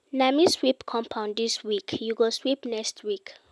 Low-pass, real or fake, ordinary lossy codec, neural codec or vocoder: none; real; none; none